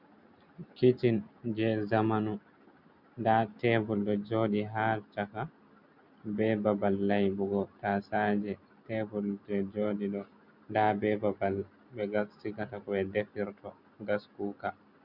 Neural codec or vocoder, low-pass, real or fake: none; 5.4 kHz; real